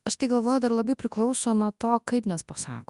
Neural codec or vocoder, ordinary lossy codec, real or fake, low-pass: codec, 24 kHz, 0.9 kbps, WavTokenizer, large speech release; Opus, 64 kbps; fake; 10.8 kHz